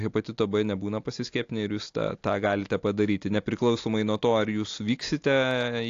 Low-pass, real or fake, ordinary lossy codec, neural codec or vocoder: 7.2 kHz; real; AAC, 48 kbps; none